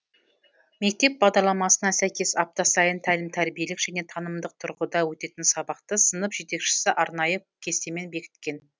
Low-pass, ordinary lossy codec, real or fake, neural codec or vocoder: none; none; real; none